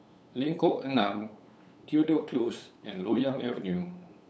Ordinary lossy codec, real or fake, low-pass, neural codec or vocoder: none; fake; none; codec, 16 kHz, 8 kbps, FunCodec, trained on LibriTTS, 25 frames a second